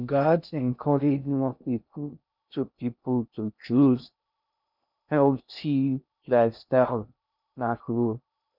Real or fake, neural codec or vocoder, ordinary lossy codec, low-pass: fake; codec, 16 kHz in and 24 kHz out, 0.6 kbps, FocalCodec, streaming, 2048 codes; none; 5.4 kHz